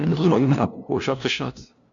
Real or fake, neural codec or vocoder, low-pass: fake; codec, 16 kHz, 0.5 kbps, FunCodec, trained on LibriTTS, 25 frames a second; 7.2 kHz